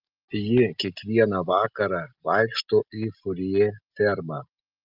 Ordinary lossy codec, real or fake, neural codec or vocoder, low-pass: Opus, 24 kbps; real; none; 5.4 kHz